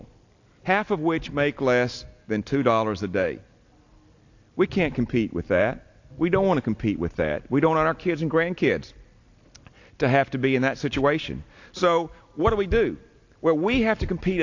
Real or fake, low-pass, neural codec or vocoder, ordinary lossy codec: real; 7.2 kHz; none; AAC, 48 kbps